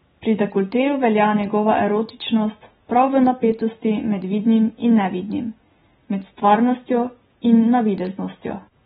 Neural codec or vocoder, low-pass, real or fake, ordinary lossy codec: none; 19.8 kHz; real; AAC, 16 kbps